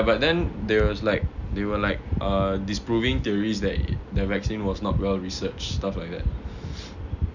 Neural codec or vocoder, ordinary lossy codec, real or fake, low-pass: none; none; real; 7.2 kHz